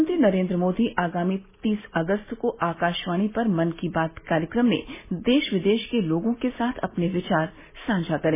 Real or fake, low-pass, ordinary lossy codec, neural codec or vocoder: real; 3.6 kHz; MP3, 16 kbps; none